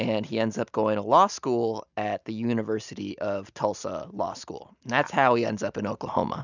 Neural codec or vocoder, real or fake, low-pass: none; real; 7.2 kHz